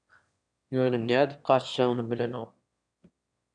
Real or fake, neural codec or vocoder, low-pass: fake; autoencoder, 22.05 kHz, a latent of 192 numbers a frame, VITS, trained on one speaker; 9.9 kHz